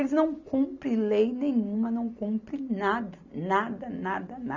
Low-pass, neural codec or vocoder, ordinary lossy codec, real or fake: 7.2 kHz; none; none; real